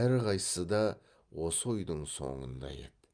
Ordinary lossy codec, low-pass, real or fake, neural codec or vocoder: Opus, 32 kbps; 9.9 kHz; real; none